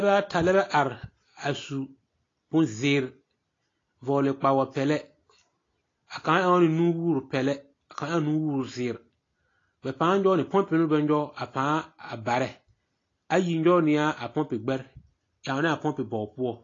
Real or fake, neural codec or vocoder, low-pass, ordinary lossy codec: real; none; 7.2 kHz; AAC, 32 kbps